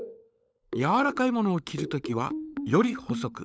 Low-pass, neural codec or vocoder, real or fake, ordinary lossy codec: none; codec, 16 kHz, 16 kbps, FunCodec, trained on LibriTTS, 50 frames a second; fake; none